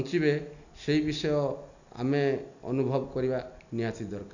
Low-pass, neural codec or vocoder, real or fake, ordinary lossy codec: 7.2 kHz; none; real; none